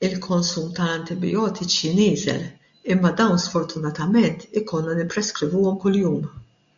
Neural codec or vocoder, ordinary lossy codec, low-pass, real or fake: none; MP3, 64 kbps; 7.2 kHz; real